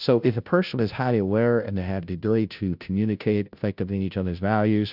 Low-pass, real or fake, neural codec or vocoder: 5.4 kHz; fake; codec, 16 kHz, 0.5 kbps, FunCodec, trained on Chinese and English, 25 frames a second